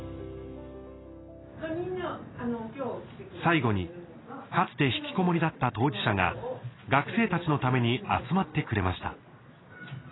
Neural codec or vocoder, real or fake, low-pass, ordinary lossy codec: none; real; 7.2 kHz; AAC, 16 kbps